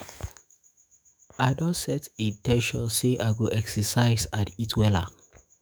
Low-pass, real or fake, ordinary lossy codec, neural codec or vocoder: none; fake; none; autoencoder, 48 kHz, 128 numbers a frame, DAC-VAE, trained on Japanese speech